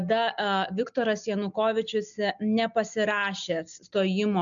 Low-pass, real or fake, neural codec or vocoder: 7.2 kHz; real; none